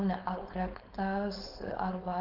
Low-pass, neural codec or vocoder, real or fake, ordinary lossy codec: 5.4 kHz; codec, 16 kHz, 4.8 kbps, FACodec; fake; Opus, 24 kbps